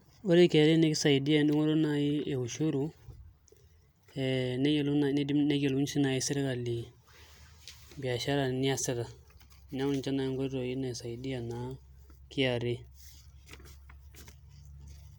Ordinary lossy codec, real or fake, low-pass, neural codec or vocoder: none; real; none; none